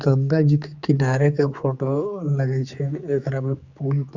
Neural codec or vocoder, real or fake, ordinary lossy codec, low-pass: codec, 16 kHz, 4 kbps, X-Codec, HuBERT features, trained on general audio; fake; Opus, 64 kbps; 7.2 kHz